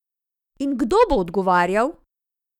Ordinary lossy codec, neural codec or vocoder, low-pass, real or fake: none; autoencoder, 48 kHz, 128 numbers a frame, DAC-VAE, trained on Japanese speech; 19.8 kHz; fake